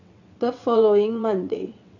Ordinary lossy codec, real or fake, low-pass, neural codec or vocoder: none; fake; 7.2 kHz; vocoder, 22.05 kHz, 80 mel bands, WaveNeXt